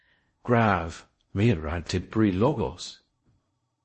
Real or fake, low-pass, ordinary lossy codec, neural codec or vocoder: fake; 10.8 kHz; MP3, 32 kbps; codec, 16 kHz in and 24 kHz out, 0.6 kbps, FocalCodec, streaming, 4096 codes